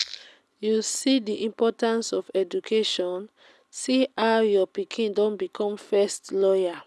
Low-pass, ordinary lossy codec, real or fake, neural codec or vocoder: none; none; real; none